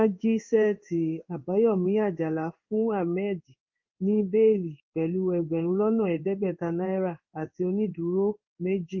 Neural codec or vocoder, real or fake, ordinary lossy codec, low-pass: codec, 16 kHz in and 24 kHz out, 1 kbps, XY-Tokenizer; fake; Opus, 24 kbps; 7.2 kHz